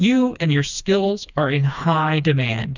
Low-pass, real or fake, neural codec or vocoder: 7.2 kHz; fake; codec, 16 kHz, 2 kbps, FreqCodec, smaller model